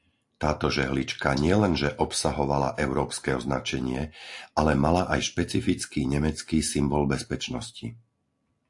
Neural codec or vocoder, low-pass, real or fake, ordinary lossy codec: none; 10.8 kHz; real; AAC, 64 kbps